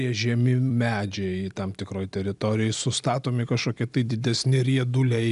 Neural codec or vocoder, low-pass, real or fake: none; 10.8 kHz; real